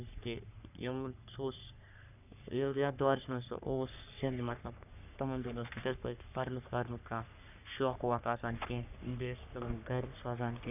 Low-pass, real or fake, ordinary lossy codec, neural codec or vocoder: 3.6 kHz; fake; none; codec, 44.1 kHz, 3.4 kbps, Pupu-Codec